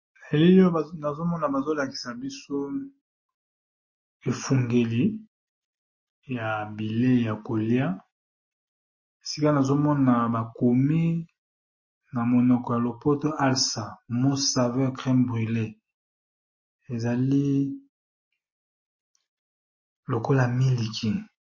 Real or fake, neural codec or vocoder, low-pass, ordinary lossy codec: real; none; 7.2 kHz; MP3, 32 kbps